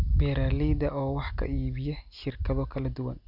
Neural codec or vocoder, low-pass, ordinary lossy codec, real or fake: none; 5.4 kHz; none; real